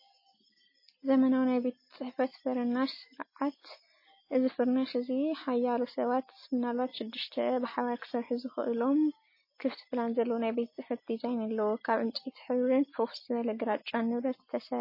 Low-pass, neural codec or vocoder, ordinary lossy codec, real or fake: 5.4 kHz; none; MP3, 24 kbps; real